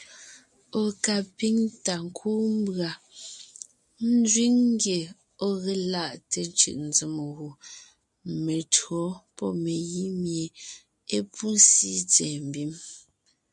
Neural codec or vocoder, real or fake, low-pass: none; real; 10.8 kHz